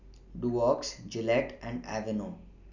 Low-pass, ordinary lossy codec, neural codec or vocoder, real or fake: 7.2 kHz; none; none; real